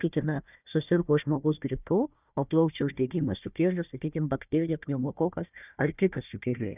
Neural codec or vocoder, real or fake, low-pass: codec, 16 kHz, 1 kbps, FunCodec, trained on Chinese and English, 50 frames a second; fake; 3.6 kHz